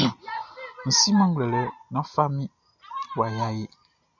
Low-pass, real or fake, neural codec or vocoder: 7.2 kHz; real; none